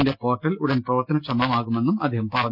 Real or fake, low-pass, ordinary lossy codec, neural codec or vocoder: real; 5.4 kHz; Opus, 32 kbps; none